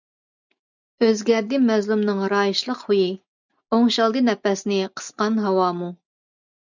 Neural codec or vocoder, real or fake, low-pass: none; real; 7.2 kHz